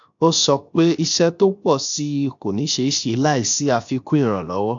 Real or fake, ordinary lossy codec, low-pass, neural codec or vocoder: fake; AAC, 64 kbps; 7.2 kHz; codec, 16 kHz, 0.7 kbps, FocalCodec